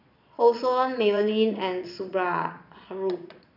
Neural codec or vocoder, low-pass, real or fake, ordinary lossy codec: vocoder, 22.05 kHz, 80 mel bands, Vocos; 5.4 kHz; fake; none